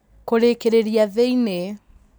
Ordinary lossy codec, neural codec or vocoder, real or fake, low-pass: none; none; real; none